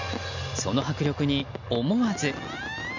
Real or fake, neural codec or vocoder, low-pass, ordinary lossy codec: real; none; 7.2 kHz; none